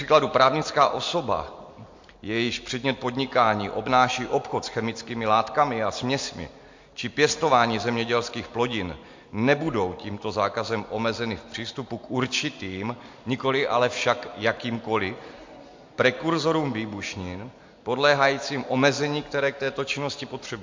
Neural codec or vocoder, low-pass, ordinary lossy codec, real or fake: none; 7.2 kHz; MP3, 48 kbps; real